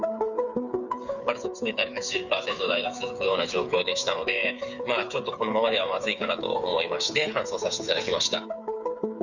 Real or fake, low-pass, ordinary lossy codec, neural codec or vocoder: fake; 7.2 kHz; none; codec, 16 kHz, 8 kbps, FreqCodec, smaller model